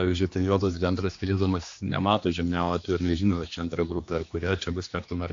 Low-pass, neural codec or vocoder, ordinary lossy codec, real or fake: 7.2 kHz; codec, 16 kHz, 2 kbps, X-Codec, HuBERT features, trained on general audio; AAC, 48 kbps; fake